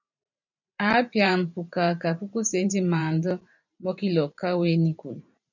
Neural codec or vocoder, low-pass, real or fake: vocoder, 44.1 kHz, 128 mel bands every 512 samples, BigVGAN v2; 7.2 kHz; fake